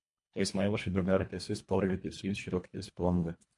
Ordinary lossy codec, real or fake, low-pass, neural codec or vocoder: MP3, 64 kbps; fake; 10.8 kHz; codec, 24 kHz, 1.5 kbps, HILCodec